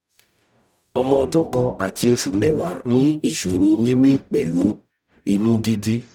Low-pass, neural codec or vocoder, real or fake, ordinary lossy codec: 19.8 kHz; codec, 44.1 kHz, 0.9 kbps, DAC; fake; none